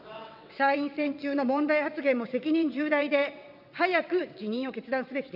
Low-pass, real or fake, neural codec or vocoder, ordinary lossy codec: 5.4 kHz; fake; vocoder, 22.05 kHz, 80 mel bands, WaveNeXt; none